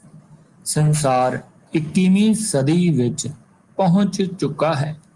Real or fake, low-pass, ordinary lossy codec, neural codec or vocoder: real; 10.8 kHz; Opus, 32 kbps; none